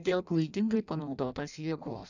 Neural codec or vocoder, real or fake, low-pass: codec, 16 kHz in and 24 kHz out, 0.6 kbps, FireRedTTS-2 codec; fake; 7.2 kHz